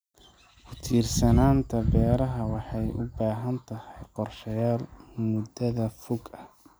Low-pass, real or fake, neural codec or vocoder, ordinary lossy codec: none; real; none; none